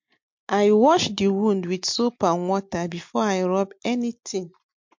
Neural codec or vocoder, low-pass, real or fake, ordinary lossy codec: none; 7.2 kHz; real; MP3, 48 kbps